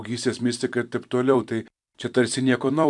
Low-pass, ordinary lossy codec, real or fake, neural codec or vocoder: 10.8 kHz; MP3, 96 kbps; fake; vocoder, 44.1 kHz, 128 mel bands every 256 samples, BigVGAN v2